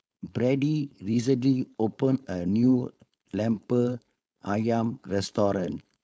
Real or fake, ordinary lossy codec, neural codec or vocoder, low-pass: fake; none; codec, 16 kHz, 4.8 kbps, FACodec; none